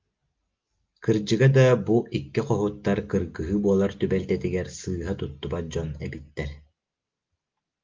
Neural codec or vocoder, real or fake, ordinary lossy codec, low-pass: none; real; Opus, 32 kbps; 7.2 kHz